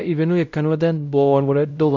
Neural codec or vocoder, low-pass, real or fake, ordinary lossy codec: codec, 16 kHz, 0.5 kbps, X-Codec, WavLM features, trained on Multilingual LibriSpeech; 7.2 kHz; fake; none